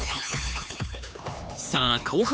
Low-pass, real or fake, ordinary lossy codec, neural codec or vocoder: none; fake; none; codec, 16 kHz, 4 kbps, X-Codec, HuBERT features, trained on LibriSpeech